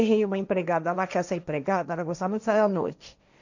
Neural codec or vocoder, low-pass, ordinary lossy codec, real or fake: codec, 16 kHz, 1.1 kbps, Voila-Tokenizer; 7.2 kHz; none; fake